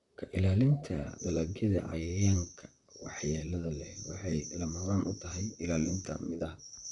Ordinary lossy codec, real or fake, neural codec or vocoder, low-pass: none; fake; vocoder, 44.1 kHz, 128 mel bands, Pupu-Vocoder; 10.8 kHz